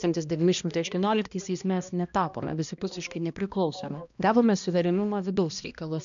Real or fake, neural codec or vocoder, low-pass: fake; codec, 16 kHz, 1 kbps, X-Codec, HuBERT features, trained on balanced general audio; 7.2 kHz